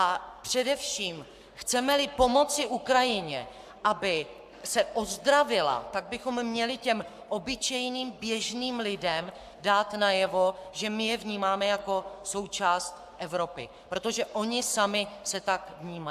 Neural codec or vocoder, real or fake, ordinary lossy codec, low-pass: codec, 44.1 kHz, 7.8 kbps, Pupu-Codec; fake; AAC, 96 kbps; 14.4 kHz